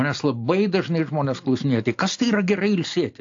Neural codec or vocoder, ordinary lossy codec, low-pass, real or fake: none; AAC, 48 kbps; 7.2 kHz; real